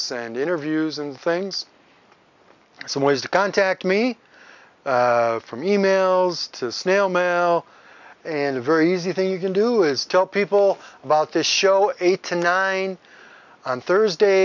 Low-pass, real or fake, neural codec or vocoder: 7.2 kHz; real; none